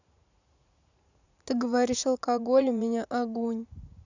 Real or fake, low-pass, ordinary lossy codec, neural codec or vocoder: fake; 7.2 kHz; none; vocoder, 44.1 kHz, 80 mel bands, Vocos